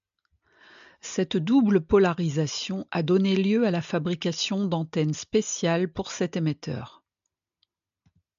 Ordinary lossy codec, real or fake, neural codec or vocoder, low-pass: AAC, 96 kbps; real; none; 7.2 kHz